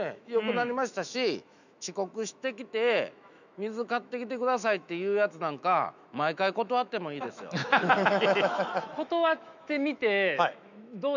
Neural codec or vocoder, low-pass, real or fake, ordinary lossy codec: autoencoder, 48 kHz, 128 numbers a frame, DAC-VAE, trained on Japanese speech; 7.2 kHz; fake; none